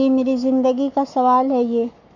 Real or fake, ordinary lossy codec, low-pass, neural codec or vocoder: fake; none; 7.2 kHz; codec, 44.1 kHz, 7.8 kbps, Pupu-Codec